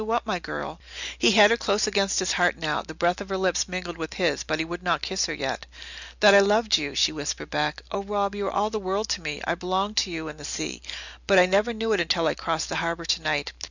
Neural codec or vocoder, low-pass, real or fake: none; 7.2 kHz; real